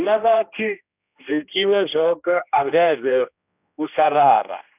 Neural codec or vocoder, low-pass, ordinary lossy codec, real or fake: codec, 16 kHz, 1 kbps, X-Codec, HuBERT features, trained on general audio; 3.6 kHz; none; fake